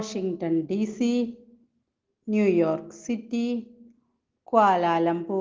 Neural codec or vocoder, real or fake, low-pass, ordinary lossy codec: none; real; 7.2 kHz; Opus, 16 kbps